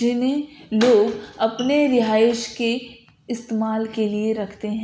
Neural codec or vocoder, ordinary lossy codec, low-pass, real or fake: none; none; none; real